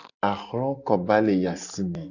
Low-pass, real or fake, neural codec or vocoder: 7.2 kHz; real; none